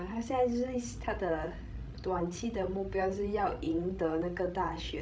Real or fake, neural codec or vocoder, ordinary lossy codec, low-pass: fake; codec, 16 kHz, 16 kbps, FreqCodec, larger model; none; none